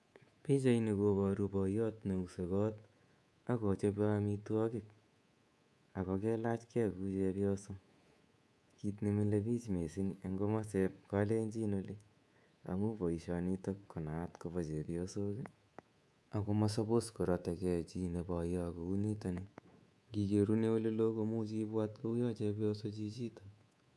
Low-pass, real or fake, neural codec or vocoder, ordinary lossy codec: none; fake; codec, 24 kHz, 3.1 kbps, DualCodec; none